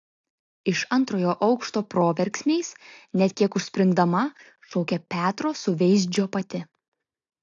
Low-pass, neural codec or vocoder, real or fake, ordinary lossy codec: 7.2 kHz; none; real; AAC, 48 kbps